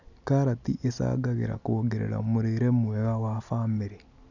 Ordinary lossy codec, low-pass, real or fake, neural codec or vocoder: none; 7.2 kHz; real; none